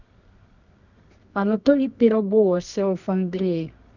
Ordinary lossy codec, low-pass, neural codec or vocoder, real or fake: none; 7.2 kHz; codec, 24 kHz, 0.9 kbps, WavTokenizer, medium music audio release; fake